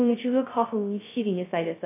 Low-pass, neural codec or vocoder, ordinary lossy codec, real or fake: 3.6 kHz; codec, 16 kHz, 0.2 kbps, FocalCodec; AAC, 24 kbps; fake